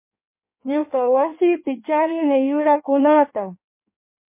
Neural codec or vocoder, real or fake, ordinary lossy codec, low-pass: codec, 16 kHz in and 24 kHz out, 1.1 kbps, FireRedTTS-2 codec; fake; MP3, 24 kbps; 3.6 kHz